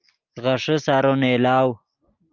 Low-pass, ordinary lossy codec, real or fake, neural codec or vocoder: 7.2 kHz; Opus, 24 kbps; real; none